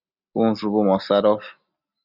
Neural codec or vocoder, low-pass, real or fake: none; 5.4 kHz; real